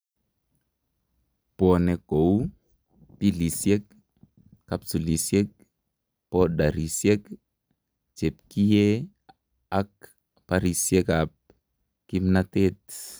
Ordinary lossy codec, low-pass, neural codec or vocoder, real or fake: none; none; none; real